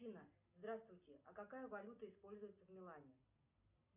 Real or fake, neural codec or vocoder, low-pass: real; none; 3.6 kHz